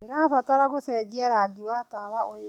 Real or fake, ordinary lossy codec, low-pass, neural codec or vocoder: fake; none; 19.8 kHz; codec, 44.1 kHz, 7.8 kbps, DAC